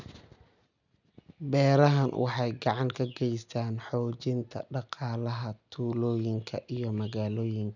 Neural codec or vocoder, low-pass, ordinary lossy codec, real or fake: none; 7.2 kHz; none; real